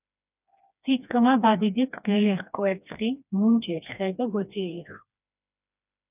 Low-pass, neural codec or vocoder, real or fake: 3.6 kHz; codec, 16 kHz, 2 kbps, FreqCodec, smaller model; fake